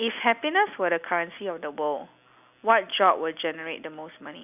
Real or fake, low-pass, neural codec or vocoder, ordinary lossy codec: real; 3.6 kHz; none; none